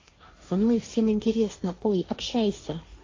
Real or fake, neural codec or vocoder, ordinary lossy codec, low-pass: fake; codec, 16 kHz, 1.1 kbps, Voila-Tokenizer; AAC, 32 kbps; 7.2 kHz